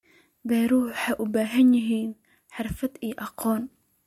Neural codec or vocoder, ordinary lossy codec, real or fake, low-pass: none; MP3, 64 kbps; real; 19.8 kHz